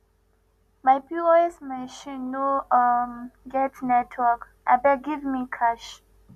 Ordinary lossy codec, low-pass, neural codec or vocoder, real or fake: none; 14.4 kHz; none; real